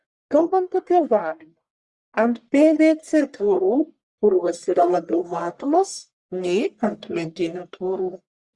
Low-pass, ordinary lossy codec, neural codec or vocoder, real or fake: 10.8 kHz; Opus, 64 kbps; codec, 44.1 kHz, 1.7 kbps, Pupu-Codec; fake